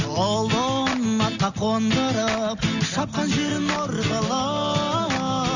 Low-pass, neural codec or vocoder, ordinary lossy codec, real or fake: 7.2 kHz; none; none; real